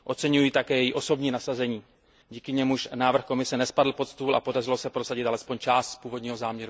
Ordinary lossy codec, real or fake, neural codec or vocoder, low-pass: none; real; none; none